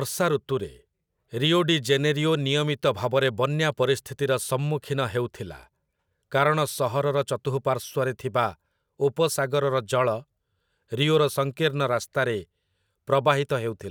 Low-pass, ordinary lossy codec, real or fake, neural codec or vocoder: none; none; real; none